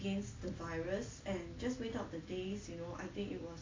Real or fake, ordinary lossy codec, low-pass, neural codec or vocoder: real; AAC, 32 kbps; 7.2 kHz; none